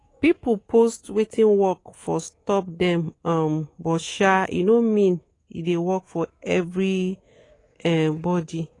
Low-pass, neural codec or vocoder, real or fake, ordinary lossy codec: 10.8 kHz; codec, 24 kHz, 3.1 kbps, DualCodec; fake; AAC, 32 kbps